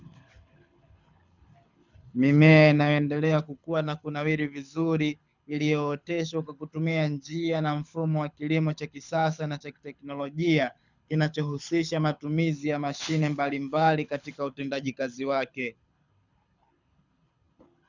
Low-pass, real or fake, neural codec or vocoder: 7.2 kHz; fake; codec, 24 kHz, 6 kbps, HILCodec